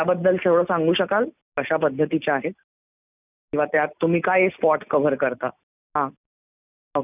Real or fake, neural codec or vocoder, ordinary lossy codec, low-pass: real; none; none; 3.6 kHz